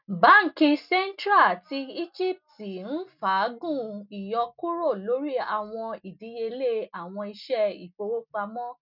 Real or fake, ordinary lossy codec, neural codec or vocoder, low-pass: real; none; none; 5.4 kHz